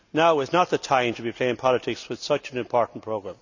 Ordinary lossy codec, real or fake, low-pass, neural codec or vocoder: none; real; 7.2 kHz; none